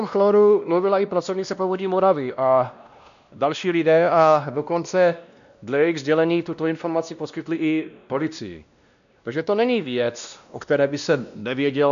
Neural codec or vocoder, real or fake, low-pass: codec, 16 kHz, 1 kbps, X-Codec, WavLM features, trained on Multilingual LibriSpeech; fake; 7.2 kHz